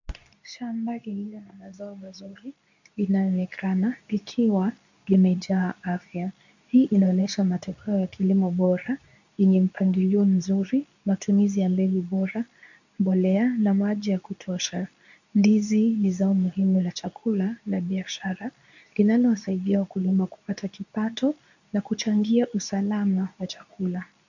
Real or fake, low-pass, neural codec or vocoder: fake; 7.2 kHz; codec, 24 kHz, 0.9 kbps, WavTokenizer, medium speech release version 2